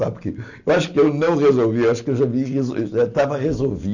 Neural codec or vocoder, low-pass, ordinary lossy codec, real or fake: none; 7.2 kHz; none; real